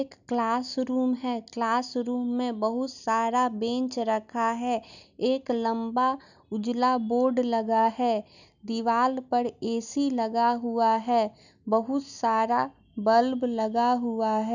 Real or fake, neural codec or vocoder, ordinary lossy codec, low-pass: real; none; none; 7.2 kHz